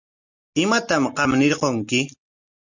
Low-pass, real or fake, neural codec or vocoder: 7.2 kHz; real; none